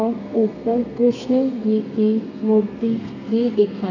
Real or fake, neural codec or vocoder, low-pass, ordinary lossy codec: fake; codec, 32 kHz, 1.9 kbps, SNAC; 7.2 kHz; none